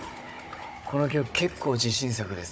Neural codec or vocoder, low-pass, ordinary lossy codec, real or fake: codec, 16 kHz, 16 kbps, FunCodec, trained on Chinese and English, 50 frames a second; none; none; fake